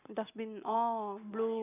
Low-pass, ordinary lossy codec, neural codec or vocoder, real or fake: 3.6 kHz; AAC, 16 kbps; none; real